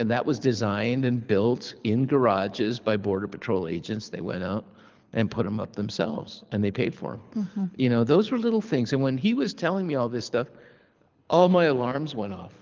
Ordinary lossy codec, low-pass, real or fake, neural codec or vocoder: Opus, 24 kbps; 7.2 kHz; fake; codec, 24 kHz, 6 kbps, HILCodec